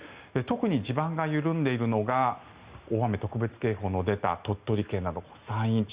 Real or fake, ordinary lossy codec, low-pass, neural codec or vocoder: real; Opus, 64 kbps; 3.6 kHz; none